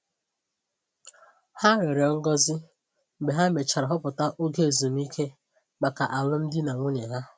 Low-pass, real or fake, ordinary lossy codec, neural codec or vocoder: none; real; none; none